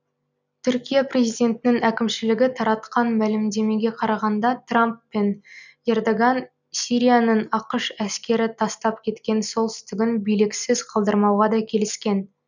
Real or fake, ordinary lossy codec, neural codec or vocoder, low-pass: real; none; none; 7.2 kHz